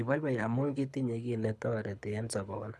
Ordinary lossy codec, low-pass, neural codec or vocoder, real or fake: none; none; codec, 24 kHz, 6 kbps, HILCodec; fake